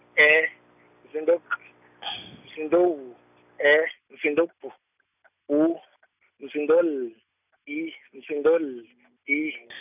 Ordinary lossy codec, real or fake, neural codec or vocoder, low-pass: none; real; none; 3.6 kHz